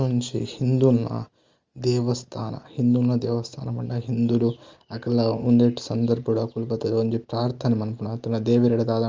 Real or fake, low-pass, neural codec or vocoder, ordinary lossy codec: real; 7.2 kHz; none; Opus, 32 kbps